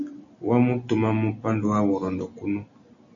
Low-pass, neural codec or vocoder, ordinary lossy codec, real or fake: 7.2 kHz; none; MP3, 96 kbps; real